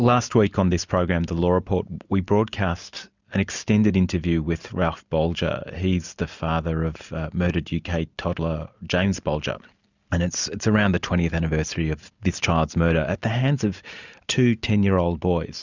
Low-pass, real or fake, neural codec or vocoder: 7.2 kHz; real; none